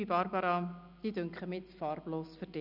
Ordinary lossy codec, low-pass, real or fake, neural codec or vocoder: none; 5.4 kHz; real; none